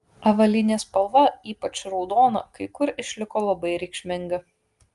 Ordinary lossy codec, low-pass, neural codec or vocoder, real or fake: Opus, 32 kbps; 10.8 kHz; none; real